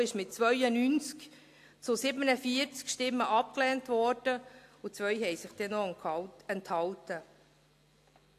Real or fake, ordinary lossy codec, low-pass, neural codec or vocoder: real; AAC, 64 kbps; 14.4 kHz; none